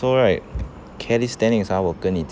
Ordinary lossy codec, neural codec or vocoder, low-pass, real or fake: none; none; none; real